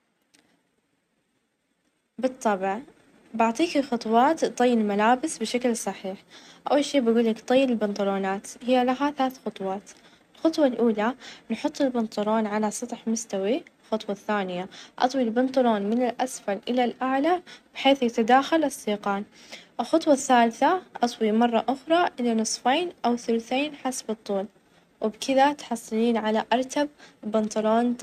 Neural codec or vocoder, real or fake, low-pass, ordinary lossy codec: none; real; 14.4 kHz; none